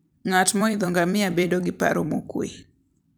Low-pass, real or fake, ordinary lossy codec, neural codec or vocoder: none; fake; none; vocoder, 44.1 kHz, 128 mel bands every 256 samples, BigVGAN v2